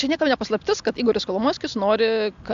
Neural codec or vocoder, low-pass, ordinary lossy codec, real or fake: none; 7.2 kHz; AAC, 64 kbps; real